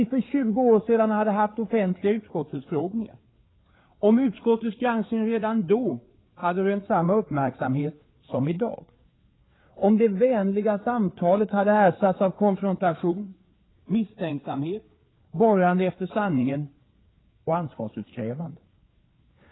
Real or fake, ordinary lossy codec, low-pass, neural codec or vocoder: fake; AAC, 16 kbps; 7.2 kHz; codec, 16 kHz, 4 kbps, FreqCodec, larger model